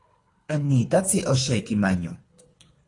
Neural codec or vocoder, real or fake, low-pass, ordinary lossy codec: codec, 24 kHz, 3 kbps, HILCodec; fake; 10.8 kHz; AAC, 32 kbps